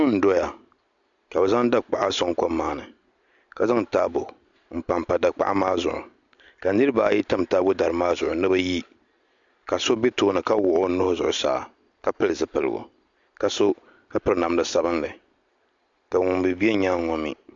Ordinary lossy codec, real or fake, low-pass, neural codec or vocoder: MP3, 64 kbps; real; 7.2 kHz; none